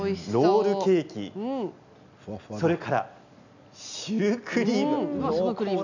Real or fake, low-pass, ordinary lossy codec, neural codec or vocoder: real; 7.2 kHz; none; none